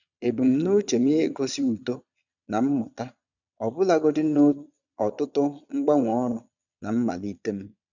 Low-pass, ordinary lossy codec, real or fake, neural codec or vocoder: 7.2 kHz; none; fake; vocoder, 22.05 kHz, 80 mel bands, Vocos